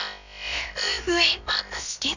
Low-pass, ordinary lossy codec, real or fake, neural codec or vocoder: 7.2 kHz; none; fake; codec, 16 kHz, about 1 kbps, DyCAST, with the encoder's durations